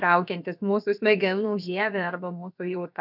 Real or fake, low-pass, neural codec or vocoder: fake; 5.4 kHz; codec, 16 kHz, about 1 kbps, DyCAST, with the encoder's durations